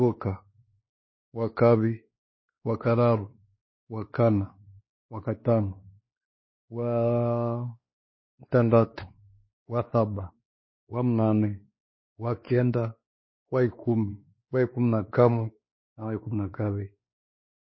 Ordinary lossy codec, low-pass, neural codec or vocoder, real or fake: MP3, 24 kbps; 7.2 kHz; codec, 16 kHz, 2 kbps, X-Codec, WavLM features, trained on Multilingual LibriSpeech; fake